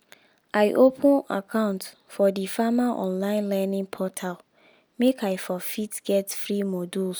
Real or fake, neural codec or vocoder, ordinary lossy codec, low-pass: real; none; none; none